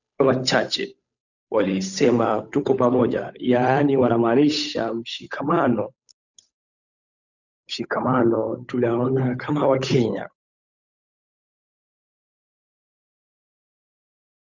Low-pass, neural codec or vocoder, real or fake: 7.2 kHz; codec, 16 kHz, 8 kbps, FunCodec, trained on Chinese and English, 25 frames a second; fake